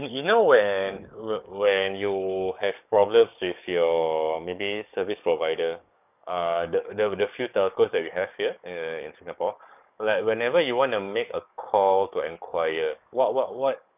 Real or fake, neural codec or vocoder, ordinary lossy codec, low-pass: fake; codec, 44.1 kHz, 7.8 kbps, DAC; none; 3.6 kHz